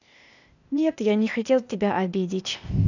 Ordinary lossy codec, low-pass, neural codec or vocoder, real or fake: none; 7.2 kHz; codec, 16 kHz, 0.8 kbps, ZipCodec; fake